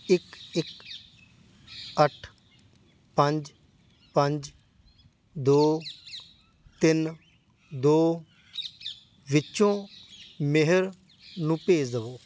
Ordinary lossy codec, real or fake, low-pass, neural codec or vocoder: none; real; none; none